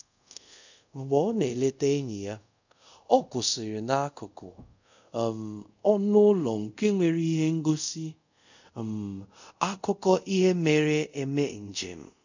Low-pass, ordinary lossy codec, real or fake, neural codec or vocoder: 7.2 kHz; none; fake; codec, 24 kHz, 0.5 kbps, DualCodec